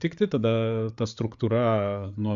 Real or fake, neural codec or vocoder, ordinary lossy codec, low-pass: fake; codec, 16 kHz, 8 kbps, FreqCodec, larger model; Opus, 64 kbps; 7.2 kHz